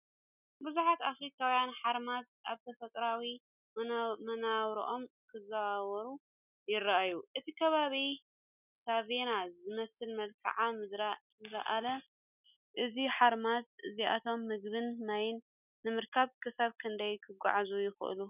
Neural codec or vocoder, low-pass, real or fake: none; 3.6 kHz; real